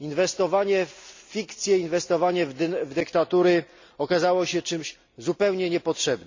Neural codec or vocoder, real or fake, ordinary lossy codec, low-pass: none; real; MP3, 64 kbps; 7.2 kHz